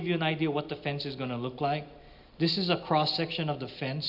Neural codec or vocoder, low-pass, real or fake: none; 5.4 kHz; real